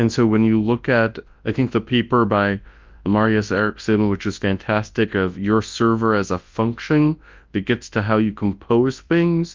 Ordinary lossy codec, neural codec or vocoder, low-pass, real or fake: Opus, 24 kbps; codec, 24 kHz, 0.9 kbps, WavTokenizer, large speech release; 7.2 kHz; fake